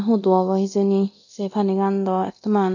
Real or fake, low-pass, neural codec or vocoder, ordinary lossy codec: fake; 7.2 kHz; codec, 24 kHz, 0.9 kbps, DualCodec; none